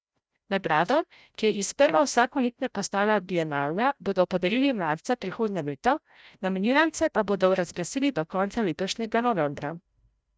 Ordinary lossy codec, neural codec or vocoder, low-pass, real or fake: none; codec, 16 kHz, 0.5 kbps, FreqCodec, larger model; none; fake